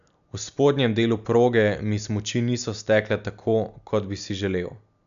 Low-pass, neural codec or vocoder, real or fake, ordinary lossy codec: 7.2 kHz; none; real; none